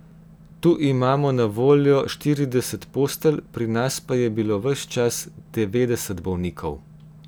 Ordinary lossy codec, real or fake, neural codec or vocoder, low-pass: none; real; none; none